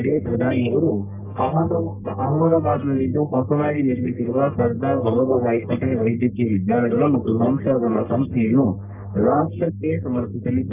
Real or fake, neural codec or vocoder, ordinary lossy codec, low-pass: fake; codec, 44.1 kHz, 1.7 kbps, Pupu-Codec; none; 3.6 kHz